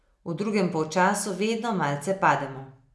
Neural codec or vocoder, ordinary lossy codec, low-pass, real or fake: none; none; none; real